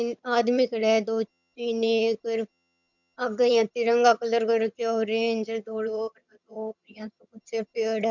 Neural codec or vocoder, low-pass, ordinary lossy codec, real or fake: vocoder, 22.05 kHz, 80 mel bands, HiFi-GAN; 7.2 kHz; none; fake